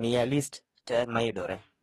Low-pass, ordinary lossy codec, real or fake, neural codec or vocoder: 19.8 kHz; AAC, 32 kbps; fake; codec, 44.1 kHz, 2.6 kbps, DAC